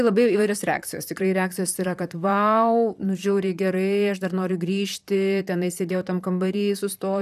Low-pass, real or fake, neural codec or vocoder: 14.4 kHz; fake; vocoder, 44.1 kHz, 128 mel bands, Pupu-Vocoder